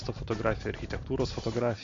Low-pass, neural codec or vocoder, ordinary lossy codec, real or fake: 7.2 kHz; none; MP3, 48 kbps; real